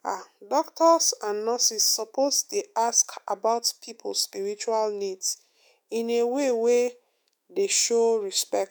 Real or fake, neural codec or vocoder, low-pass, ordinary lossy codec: fake; autoencoder, 48 kHz, 128 numbers a frame, DAC-VAE, trained on Japanese speech; none; none